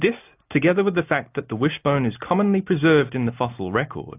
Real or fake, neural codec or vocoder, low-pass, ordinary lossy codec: real; none; 3.6 kHz; AAC, 32 kbps